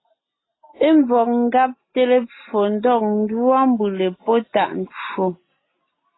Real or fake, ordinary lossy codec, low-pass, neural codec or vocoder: real; AAC, 16 kbps; 7.2 kHz; none